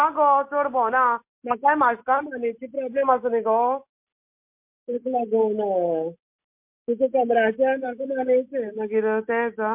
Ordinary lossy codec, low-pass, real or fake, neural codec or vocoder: MP3, 32 kbps; 3.6 kHz; real; none